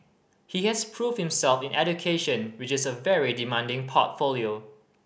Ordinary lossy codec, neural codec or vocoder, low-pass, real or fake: none; none; none; real